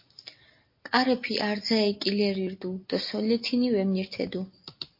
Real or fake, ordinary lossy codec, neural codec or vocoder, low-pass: real; MP3, 32 kbps; none; 5.4 kHz